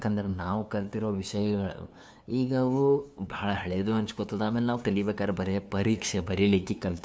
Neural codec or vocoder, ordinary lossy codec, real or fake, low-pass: codec, 16 kHz, 2 kbps, FunCodec, trained on LibriTTS, 25 frames a second; none; fake; none